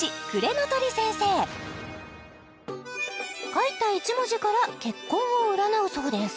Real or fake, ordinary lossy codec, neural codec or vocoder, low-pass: real; none; none; none